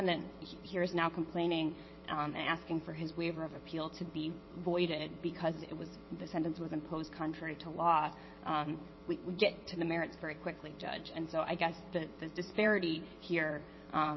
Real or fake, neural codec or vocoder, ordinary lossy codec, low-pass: fake; vocoder, 22.05 kHz, 80 mel bands, WaveNeXt; MP3, 24 kbps; 7.2 kHz